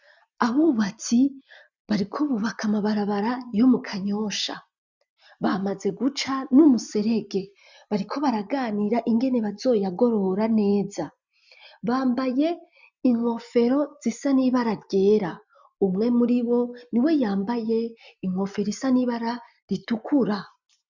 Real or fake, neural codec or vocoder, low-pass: real; none; 7.2 kHz